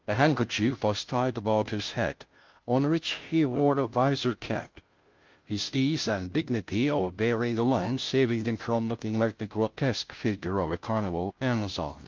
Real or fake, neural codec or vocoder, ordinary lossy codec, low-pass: fake; codec, 16 kHz, 0.5 kbps, FunCodec, trained on Chinese and English, 25 frames a second; Opus, 32 kbps; 7.2 kHz